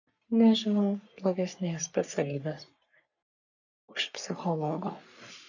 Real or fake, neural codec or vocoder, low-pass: fake; codec, 44.1 kHz, 3.4 kbps, Pupu-Codec; 7.2 kHz